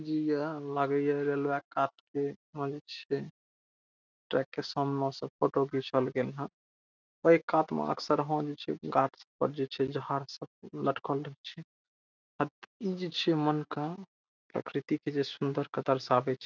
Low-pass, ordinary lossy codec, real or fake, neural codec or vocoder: 7.2 kHz; none; real; none